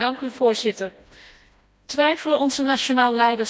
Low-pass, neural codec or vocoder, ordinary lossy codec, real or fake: none; codec, 16 kHz, 1 kbps, FreqCodec, smaller model; none; fake